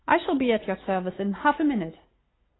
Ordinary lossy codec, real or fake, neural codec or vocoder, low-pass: AAC, 16 kbps; real; none; 7.2 kHz